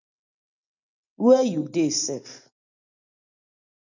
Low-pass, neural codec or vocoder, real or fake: 7.2 kHz; none; real